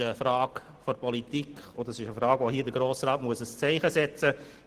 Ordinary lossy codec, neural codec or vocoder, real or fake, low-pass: Opus, 16 kbps; none; real; 14.4 kHz